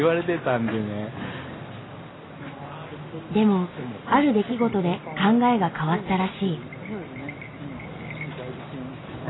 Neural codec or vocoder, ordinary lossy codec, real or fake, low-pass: none; AAC, 16 kbps; real; 7.2 kHz